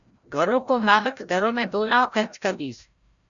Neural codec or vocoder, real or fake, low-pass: codec, 16 kHz, 0.5 kbps, FreqCodec, larger model; fake; 7.2 kHz